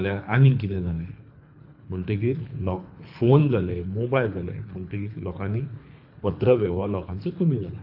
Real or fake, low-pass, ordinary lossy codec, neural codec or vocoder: fake; 5.4 kHz; AAC, 48 kbps; codec, 24 kHz, 3 kbps, HILCodec